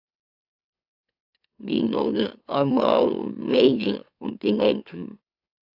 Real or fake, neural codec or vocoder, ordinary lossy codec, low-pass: fake; autoencoder, 44.1 kHz, a latent of 192 numbers a frame, MeloTTS; AAC, 48 kbps; 5.4 kHz